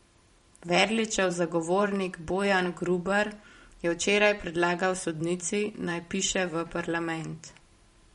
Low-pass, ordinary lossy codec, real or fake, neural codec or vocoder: 19.8 kHz; MP3, 48 kbps; fake; vocoder, 44.1 kHz, 128 mel bands every 512 samples, BigVGAN v2